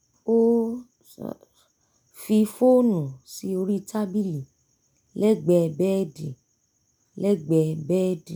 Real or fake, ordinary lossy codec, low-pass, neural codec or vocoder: real; none; none; none